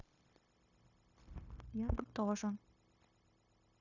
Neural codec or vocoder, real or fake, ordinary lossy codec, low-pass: codec, 16 kHz, 0.9 kbps, LongCat-Audio-Codec; fake; none; 7.2 kHz